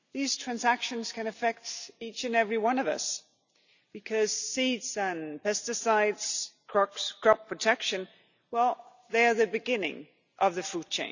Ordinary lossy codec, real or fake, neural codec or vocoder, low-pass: none; real; none; 7.2 kHz